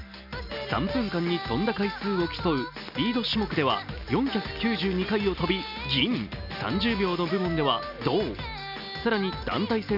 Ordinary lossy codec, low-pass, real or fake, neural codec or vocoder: none; 5.4 kHz; real; none